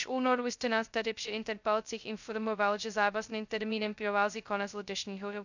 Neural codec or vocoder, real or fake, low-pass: codec, 16 kHz, 0.2 kbps, FocalCodec; fake; 7.2 kHz